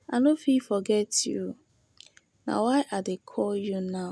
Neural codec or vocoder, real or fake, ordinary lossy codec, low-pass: none; real; none; none